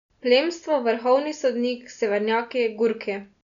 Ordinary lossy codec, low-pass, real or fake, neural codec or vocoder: none; 7.2 kHz; real; none